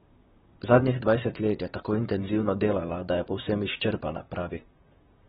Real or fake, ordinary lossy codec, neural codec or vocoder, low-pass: fake; AAC, 16 kbps; vocoder, 44.1 kHz, 128 mel bands, Pupu-Vocoder; 19.8 kHz